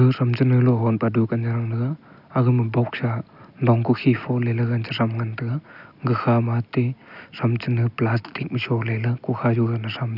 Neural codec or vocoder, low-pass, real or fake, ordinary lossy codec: none; 5.4 kHz; real; none